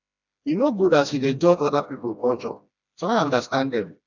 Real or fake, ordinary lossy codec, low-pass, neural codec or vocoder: fake; none; 7.2 kHz; codec, 16 kHz, 1 kbps, FreqCodec, smaller model